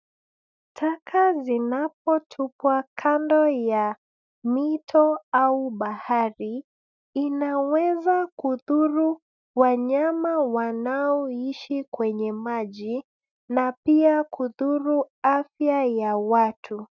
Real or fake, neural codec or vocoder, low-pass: real; none; 7.2 kHz